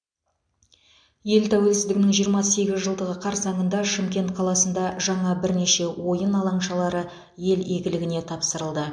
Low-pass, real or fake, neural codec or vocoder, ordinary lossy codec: 9.9 kHz; real; none; MP3, 64 kbps